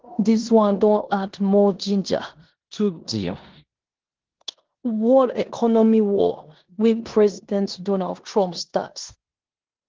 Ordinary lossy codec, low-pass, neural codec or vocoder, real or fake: Opus, 16 kbps; 7.2 kHz; codec, 16 kHz in and 24 kHz out, 0.9 kbps, LongCat-Audio-Codec, four codebook decoder; fake